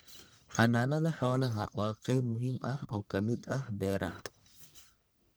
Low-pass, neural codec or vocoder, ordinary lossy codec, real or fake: none; codec, 44.1 kHz, 1.7 kbps, Pupu-Codec; none; fake